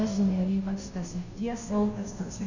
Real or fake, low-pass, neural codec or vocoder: fake; 7.2 kHz; codec, 16 kHz, 0.5 kbps, FunCodec, trained on Chinese and English, 25 frames a second